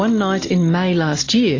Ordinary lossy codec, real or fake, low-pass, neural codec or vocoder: AAC, 32 kbps; real; 7.2 kHz; none